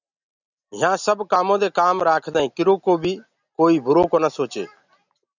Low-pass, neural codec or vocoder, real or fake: 7.2 kHz; none; real